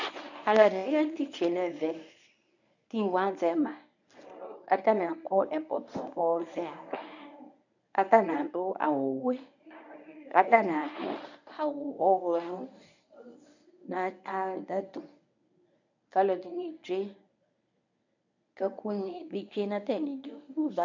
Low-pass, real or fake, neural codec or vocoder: 7.2 kHz; fake; codec, 24 kHz, 0.9 kbps, WavTokenizer, medium speech release version 1